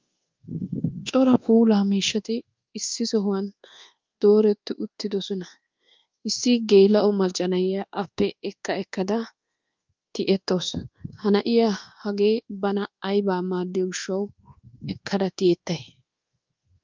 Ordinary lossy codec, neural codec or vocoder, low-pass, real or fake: Opus, 32 kbps; codec, 24 kHz, 1.2 kbps, DualCodec; 7.2 kHz; fake